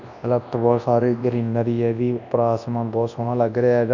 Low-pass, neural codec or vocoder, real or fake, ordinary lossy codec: 7.2 kHz; codec, 24 kHz, 0.9 kbps, WavTokenizer, large speech release; fake; none